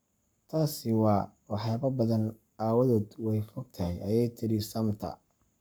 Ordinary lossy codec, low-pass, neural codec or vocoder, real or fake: none; none; codec, 44.1 kHz, 7.8 kbps, Pupu-Codec; fake